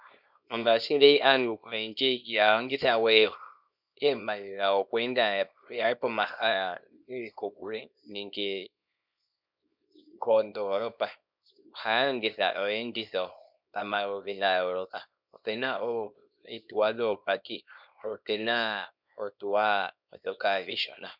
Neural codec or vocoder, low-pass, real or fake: codec, 24 kHz, 0.9 kbps, WavTokenizer, small release; 5.4 kHz; fake